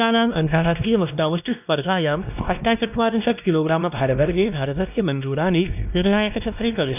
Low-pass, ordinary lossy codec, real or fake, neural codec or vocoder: 3.6 kHz; none; fake; codec, 16 kHz, 1 kbps, X-Codec, WavLM features, trained on Multilingual LibriSpeech